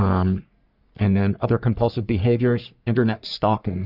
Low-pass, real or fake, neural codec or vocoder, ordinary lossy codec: 5.4 kHz; fake; codec, 44.1 kHz, 3.4 kbps, Pupu-Codec; Opus, 64 kbps